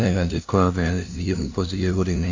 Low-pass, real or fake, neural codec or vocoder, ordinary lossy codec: 7.2 kHz; fake; codec, 16 kHz, 0.5 kbps, FunCodec, trained on LibriTTS, 25 frames a second; AAC, 48 kbps